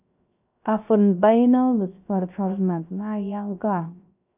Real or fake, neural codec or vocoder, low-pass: fake; codec, 16 kHz, 0.3 kbps, FocalCodec; 3.6 kHz